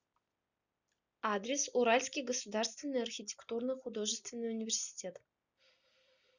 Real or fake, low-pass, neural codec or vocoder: real; 7.2 kHz; none